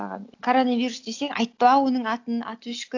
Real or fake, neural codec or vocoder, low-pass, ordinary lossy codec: real; none; 7.2 kHz; AAC, 48 kbps